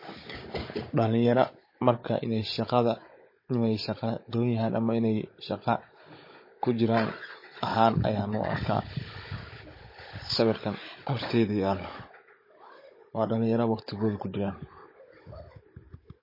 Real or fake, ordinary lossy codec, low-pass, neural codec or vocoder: fake; MP3, 24 kbps; 5.4 kHz; codec, 16 kHz, 16 kbps, FunCodec, trained on Chinese and English, 50 frames a second